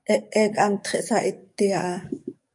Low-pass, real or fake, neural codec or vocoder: 10.8 kHz; fake; codec, 44.1 kHz, 7.8 kbps, DAC